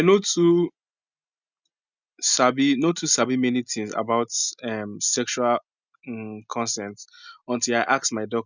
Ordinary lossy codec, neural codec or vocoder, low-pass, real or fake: none; none; 7.2 kHz; real